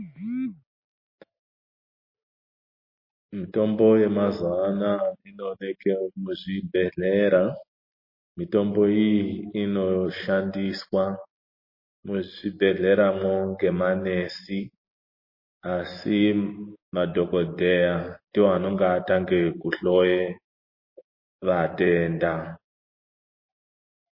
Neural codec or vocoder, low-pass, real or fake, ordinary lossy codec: none; 5.4 kHz; real; MP3, 24 kbps